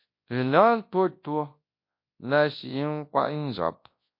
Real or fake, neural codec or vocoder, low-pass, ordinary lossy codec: fake; codec, 24 kHz, 0.9 kbps, WavTokenizer, large speech release; 5.4 kHz; MP3, 32 kbps